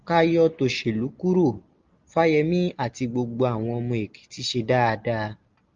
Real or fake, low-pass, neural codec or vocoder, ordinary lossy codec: real; 7.2 kHz; none; Opus, 32 kbps